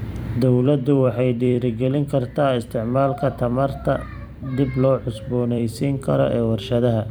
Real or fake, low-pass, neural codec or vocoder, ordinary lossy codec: fake; none; vocoder, 44.1 kHz, 128 mel bands every 256 samples, BigVGAN v2; none